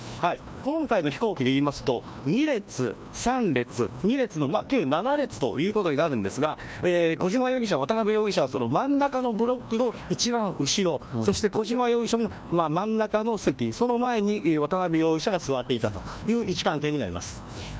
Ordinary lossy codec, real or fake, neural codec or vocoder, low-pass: none; fake; codec, 16 kHz, 1 kbps, FreqCodec, larger model; none